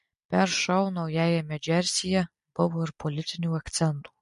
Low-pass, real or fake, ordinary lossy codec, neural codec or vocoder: 14.4 kHz; real; MP3, 48 kbps; none